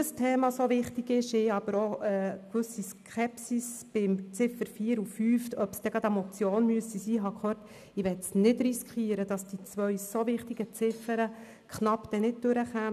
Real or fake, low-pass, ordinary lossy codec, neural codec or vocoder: real; 14.4 kHz; none; none